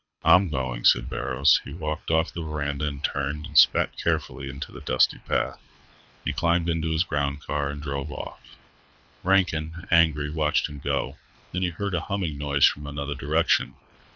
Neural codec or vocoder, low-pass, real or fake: codec, 24 kHz, 6 kbps, HILCodec; 7.2 kHz; fake